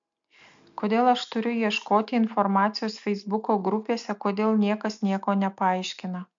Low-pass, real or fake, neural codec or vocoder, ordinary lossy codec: 7.2 kHz; real; none; MP3, 64 kbps